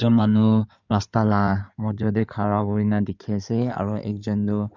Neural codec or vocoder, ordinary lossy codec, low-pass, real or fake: codec, 16 kHz in and 24 kHz out, 2.2 kbps, FireRedTTS-2 codec; none; 7.2 kHz; fake